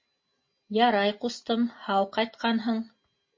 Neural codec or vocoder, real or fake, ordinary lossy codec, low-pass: none; real; MP3, 32 kbps; 7.2 kHz